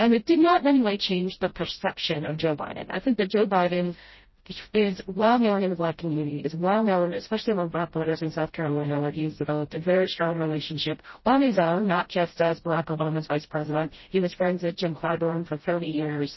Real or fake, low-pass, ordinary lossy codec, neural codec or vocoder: fake; 7.2 kHz; MP3, 24 kbps; codec, 16 kHz, 0.5 kbps, FreqCodec, smaller model